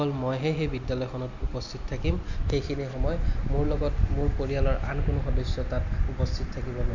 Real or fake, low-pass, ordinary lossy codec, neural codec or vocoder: real; 7.2 kHz; none; none